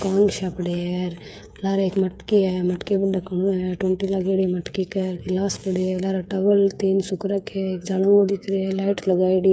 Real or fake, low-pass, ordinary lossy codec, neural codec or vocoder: fake; none; none; codec, 16 kHz, 8 kbps, FreqCodec, smaller model